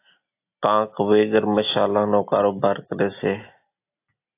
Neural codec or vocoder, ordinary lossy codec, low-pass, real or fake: none; AAC, 24 kbps; 3.6 kHz; real